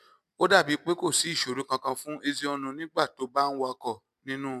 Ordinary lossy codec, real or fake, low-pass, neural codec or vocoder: none; real; 14.4 kHz; none